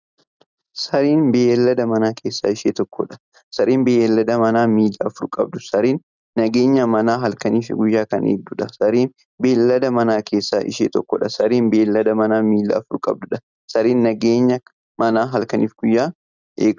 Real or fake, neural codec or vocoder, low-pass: real; none; 7.2 kHz